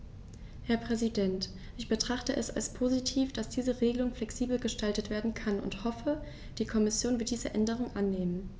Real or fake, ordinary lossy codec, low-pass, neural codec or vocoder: real; none; none; none